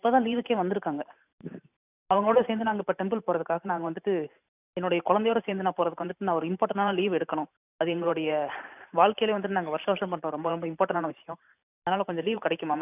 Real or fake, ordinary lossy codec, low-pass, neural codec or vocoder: fake; none; 3.6 kHz; vocoder, 44.1 kHz, 128 mel bands every 512 samples, BigVGAN v2